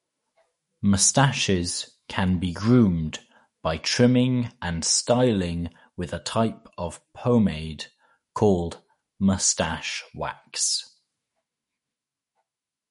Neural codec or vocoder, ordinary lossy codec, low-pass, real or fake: autoencoder, 48 kHz, 128 numbers a frame, DAC-VAE, trained on Japanese speech; MP3, 48 kbps; 19.8 kHz; fake